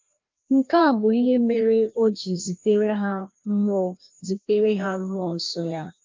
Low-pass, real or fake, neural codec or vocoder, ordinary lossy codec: 7.2 kHz; fake; codec, 16 kHz, 1 kbps, FreqCodec, larger model; Opus, 24 kbps